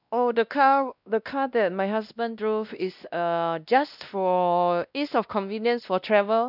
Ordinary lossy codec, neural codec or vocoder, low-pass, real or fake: none; codec, 16 kHz, 1 kbps, X-Codec, WavLM features, trained on Multilingual LibriSpeech; 5.4 kHz; fake